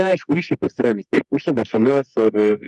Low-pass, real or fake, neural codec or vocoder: 10.8 kHz; fake; codec, 24 kHz, 0.9 kbps, WavTokenizer, medium music audio release